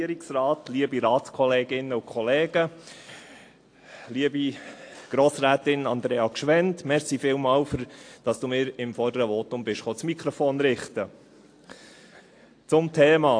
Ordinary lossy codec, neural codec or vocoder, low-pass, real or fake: AAC, 48 kbps; none; 9.9 kHz; real